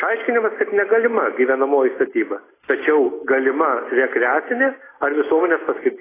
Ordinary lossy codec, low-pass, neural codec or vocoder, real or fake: AAC, 16 kbps; 3.6 kHz; none; real